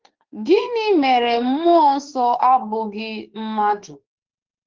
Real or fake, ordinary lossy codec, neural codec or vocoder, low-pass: fake; Opus, 16 kbps; autoencoder, 48 kHz, 32 numbers a frame, DAC-VAE, trained on Japanese speech; 7.2 kHz